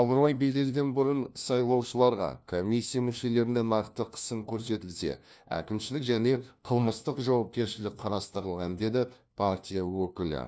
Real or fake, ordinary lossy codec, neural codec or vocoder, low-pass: fake; none; codec, 16 kHz, 1 kbps, FunCodec, trained on LibriTTS, 50 frames a second; none